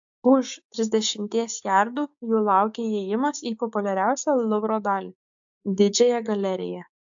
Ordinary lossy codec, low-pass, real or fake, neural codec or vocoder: AAC, 64 kbps; 7.2 kHz; fake; codec, 16 kHz, 6 kbps, DAC